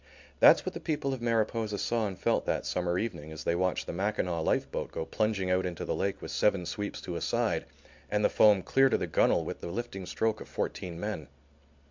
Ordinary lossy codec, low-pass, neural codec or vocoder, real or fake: MP3, 64 kbps; 7.2 kHz; none; real